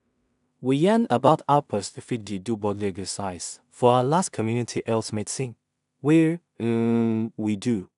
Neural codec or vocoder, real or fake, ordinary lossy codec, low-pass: codec, 16 kHz in and 24 kHz out, 0.4 kbps, LongCat-Audio-Codec, two codebook decoder; fake; none; 10.8 kHz